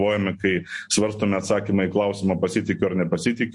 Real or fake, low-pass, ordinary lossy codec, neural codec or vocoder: real; 10.8 kHz; MP3, 48 kbps; none